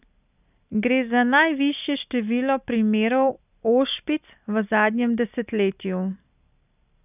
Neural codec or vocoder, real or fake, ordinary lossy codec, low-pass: none; real; none; 3.6 kHz